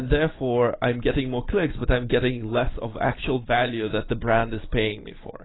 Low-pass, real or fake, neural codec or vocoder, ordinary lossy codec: 7.2 kHz; real; none; AAC, 16 kbps